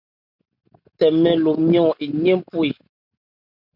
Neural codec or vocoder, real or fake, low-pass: none; real; 5.4 kHz